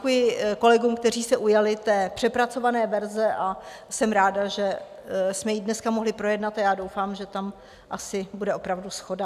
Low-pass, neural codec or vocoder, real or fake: 14.4 kHz; none; real